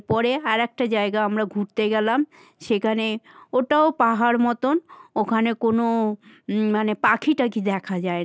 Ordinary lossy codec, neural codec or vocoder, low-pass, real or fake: none; none; none; real